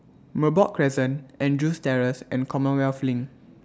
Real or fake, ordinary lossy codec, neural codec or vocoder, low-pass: real; none; none; none